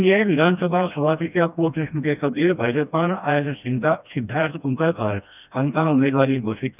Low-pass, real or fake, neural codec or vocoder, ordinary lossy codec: 3.6 kHz; fake; codec, 16 kHz, 1 kbps, FreqCodec, smaller model; none